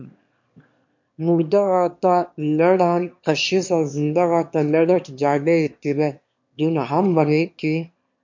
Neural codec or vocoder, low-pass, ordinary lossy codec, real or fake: autoencoder, 22.05 kHz, a latent of 192 numbers a frame, VITS, trained on one speaker; 7.2 kHz; MP3, 48 kbps; fake